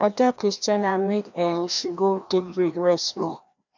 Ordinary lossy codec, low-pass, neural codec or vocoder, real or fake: none; 7.2 kHz; codec, 16 kHz, 1 kbps, FreqCodec, larger model; fake